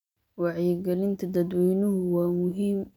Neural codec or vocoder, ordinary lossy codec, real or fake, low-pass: none; none; real; 19.8 kHz